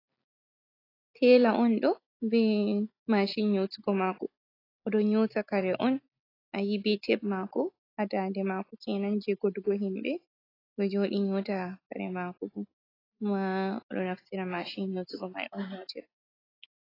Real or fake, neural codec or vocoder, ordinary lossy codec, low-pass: real; none; AAC, 24 kbps; 5.4 kHz